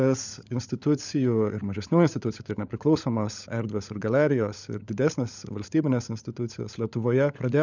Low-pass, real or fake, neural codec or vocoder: 7.2 kHz; fake; codec, 16 kHz, 16 kbps, FunCodec, trained on LibriTTS, 50 frames a second